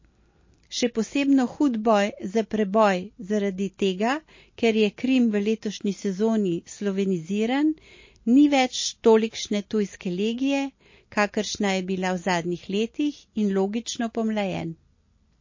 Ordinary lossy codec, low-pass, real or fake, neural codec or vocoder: MP3, 32 kbps; 7.2 kHz; real; none